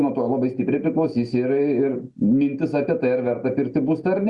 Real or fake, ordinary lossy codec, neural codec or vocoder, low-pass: real; Opus, 64 kbps; none; 10.8 kHz